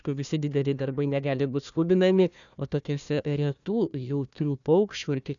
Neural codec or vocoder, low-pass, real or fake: codec, 16 kHz, 1 kbps, FunCodec, trained on Chinese and English, 50 frames a second; 7.2 kHz; fake